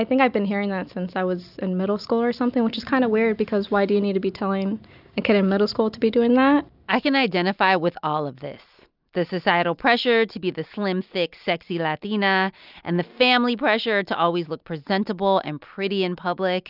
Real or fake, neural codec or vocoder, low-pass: real; none; 5.4 kHz